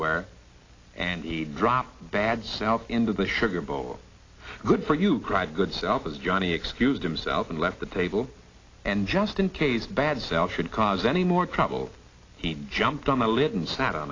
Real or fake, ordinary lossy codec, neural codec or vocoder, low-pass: real; AAC, 32 kbps; none; 7.2 kHz